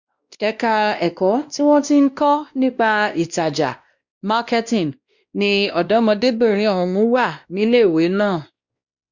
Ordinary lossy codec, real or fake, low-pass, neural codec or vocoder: Opus, 64 kbps; fake; 7.2 kHz; codec, 16 kHz, 1 kbps, X-Codec, WavLM features, trained on Multilingual LibriSpeech